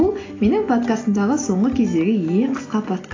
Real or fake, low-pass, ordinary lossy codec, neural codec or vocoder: real; 7.2 kHz; AAC, 32 kbps; none